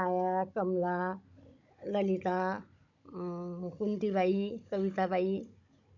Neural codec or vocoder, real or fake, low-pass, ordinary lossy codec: codec, 16 kHz, 16 kbps, FunCodec, trained on Chinese and English, 50 frames a second; fake; 7.2 kHz; none